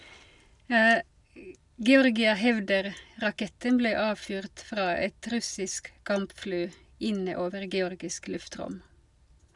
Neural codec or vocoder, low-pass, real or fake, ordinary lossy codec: none; 10.8 kHz; real; none